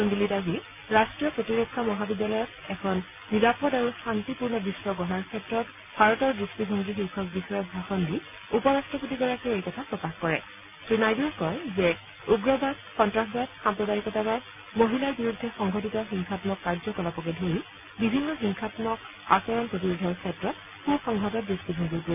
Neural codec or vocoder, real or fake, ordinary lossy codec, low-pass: none; real; none; 3.6 kHz